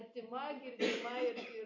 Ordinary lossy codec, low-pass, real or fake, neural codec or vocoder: MP3, 48 kbps; 7.2 kHz; real; none